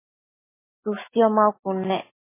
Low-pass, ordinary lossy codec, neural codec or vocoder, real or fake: 3.6 kHz; MP3, 16 kbps; none; real